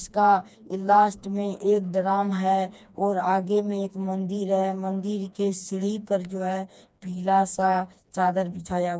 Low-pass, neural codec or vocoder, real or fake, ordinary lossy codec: none; codec, 16 kHz, 2 kbps, FreqCodec, smaller model; fake; none